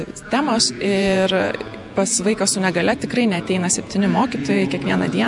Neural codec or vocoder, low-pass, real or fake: vocoder, 48 kHz, 128 mel bands, Vocos; 10.8 kHz; fake